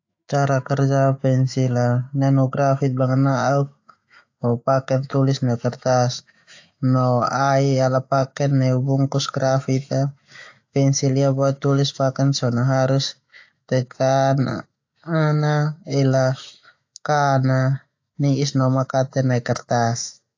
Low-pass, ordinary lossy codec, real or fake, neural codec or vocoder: 7.2 kHz; AAC, 48 kbps; fake; autoencoder, 48 kHz, 128 numbers a frame, DAC-VAE, trained on Japanese speech